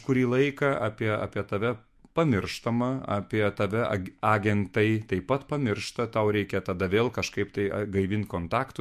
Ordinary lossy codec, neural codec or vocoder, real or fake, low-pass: MP3, 64 kbps; autoencoder, 48 kHz, 128 numbers a frame, DAC-VAE, trained on Japanese speech; fake; 14.4 kHz